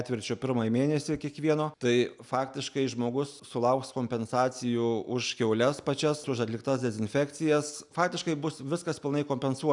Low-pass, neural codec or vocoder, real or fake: 10.8 kHz; none; real